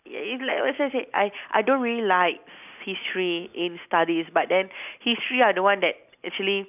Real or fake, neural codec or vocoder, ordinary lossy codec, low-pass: real; none; none; 3.6 kHz